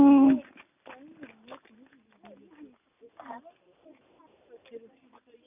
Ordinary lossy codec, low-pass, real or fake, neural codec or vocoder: none; 3.6 kHz; real; none